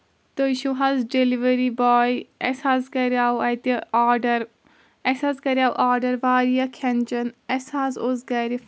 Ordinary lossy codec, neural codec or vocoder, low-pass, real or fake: none; none; none; real